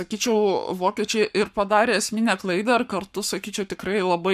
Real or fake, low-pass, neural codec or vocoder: fake; 14.4 kHz; codec, 44.1 kHz, 7.8 kbps, Pupu-Codec